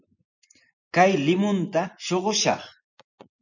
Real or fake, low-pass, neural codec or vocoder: fake; 7.2 kHz; vocoder, 24 kHz, 100 mel bands, Vocos